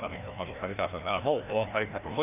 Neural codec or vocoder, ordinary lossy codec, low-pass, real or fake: codec, 16 kHz, 1 kbps, FreqCodec, larger model; none; 3.6 kHz; fake